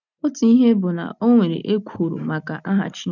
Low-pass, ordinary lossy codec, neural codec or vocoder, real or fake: 7.2 kHz; none; none; real